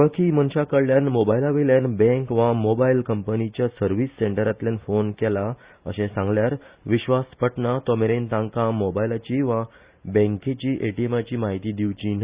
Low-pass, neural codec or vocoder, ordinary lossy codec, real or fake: 3.6 kHz; none; Opus, 64 kbps; real